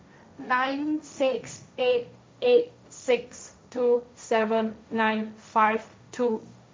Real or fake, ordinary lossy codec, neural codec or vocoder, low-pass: fake; none; codec, 16 kHz, 1.1 kbps, Voila-Tokenizer; none